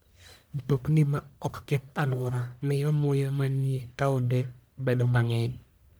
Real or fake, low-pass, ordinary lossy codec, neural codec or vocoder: fake; none; none; codec, 44.1 kHz, 1.7 kbps, Pupu-Codec